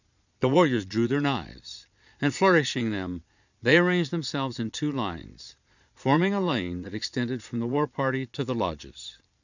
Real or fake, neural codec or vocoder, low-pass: fake; vocoder, 22.05 kHz, 80 mel bands, Vocos; 7.2 kHz